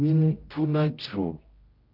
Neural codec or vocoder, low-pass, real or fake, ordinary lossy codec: codec, 16 kHz, 0.5 kbps, X-Codec, HuBERT features, trained on general audio; 5.4 kHz; fake; Opus, 32 kbps